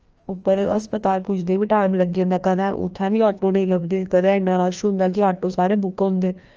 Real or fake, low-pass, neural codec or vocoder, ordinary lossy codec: fake; 7.2 kHz; codec, 16 kHz, 1 kbps, FreqCodec, larger model; Opus, 24 kbps